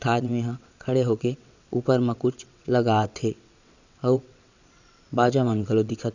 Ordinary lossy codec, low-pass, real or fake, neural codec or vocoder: none; 7.2 kHz; fake; vocoder, 22.05 kHz, 80 mel bands, WaveNeXt